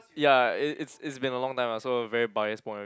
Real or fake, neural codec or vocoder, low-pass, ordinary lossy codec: real; none; none; none